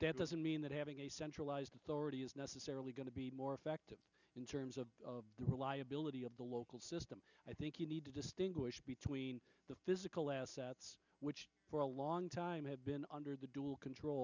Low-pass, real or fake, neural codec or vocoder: 7.2 kHz; real; none